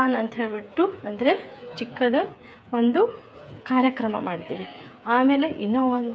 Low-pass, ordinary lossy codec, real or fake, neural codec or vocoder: none; none; fake; codec, 16 kHz, 8 kbps, FreqCodec, smaller model